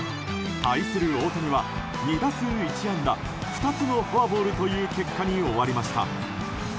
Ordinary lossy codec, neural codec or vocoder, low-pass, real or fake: none; none; none; real